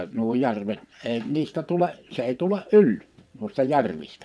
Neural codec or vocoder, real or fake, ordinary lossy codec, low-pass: vocoder, 22.05 kHz, 80 mel bands, WaveNeXt; fake; none; none